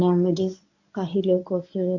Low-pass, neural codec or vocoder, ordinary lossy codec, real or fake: 7.2 kHz; codec, 24 kHz, 0.9 kbps, WavTokenizer, medium speech release version 1; none; fake